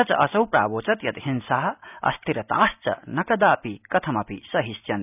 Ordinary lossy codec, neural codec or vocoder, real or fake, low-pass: none; none; real; 3.6 kHz